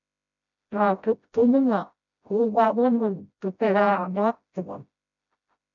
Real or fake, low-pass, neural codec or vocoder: fake; 7.2 kHz; codec, 16 kHz, 0.5 kbps, FreqCodec, smaller model